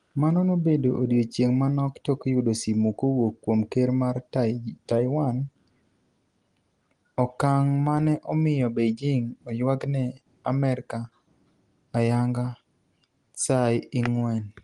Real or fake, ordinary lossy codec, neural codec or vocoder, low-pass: real; Opus, 24 kbps; none; 10.8 kHz